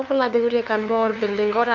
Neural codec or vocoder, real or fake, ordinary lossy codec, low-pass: codec, 16 kHz, 2 kbps, FunCodec, trained on LibriTTS, 25 frames a second; fake; none; 7.2 kHz